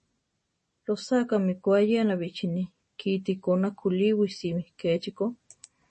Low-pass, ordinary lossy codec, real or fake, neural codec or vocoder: 10.8 kHz; MP3, 32 kbps; real; none